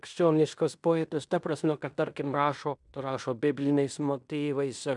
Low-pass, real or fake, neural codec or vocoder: 10.8 kHz; fake; codec, 16 kHz in and 24 kHz out, 0.9 kbps, LongCat-Audio-Codec, fine tuned four codebook decoder